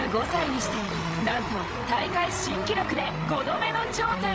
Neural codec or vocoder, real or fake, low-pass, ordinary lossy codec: codec, 16 kHz, 8 kbps, FreqCodec, larger model; fake; none; none